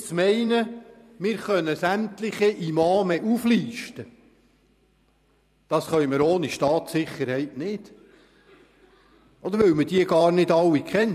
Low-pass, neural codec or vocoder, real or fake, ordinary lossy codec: 14.4 kHz; vocoder, 44.1 kHz, 128 mel bands every 512 samples, BigVGAN v2; fake; none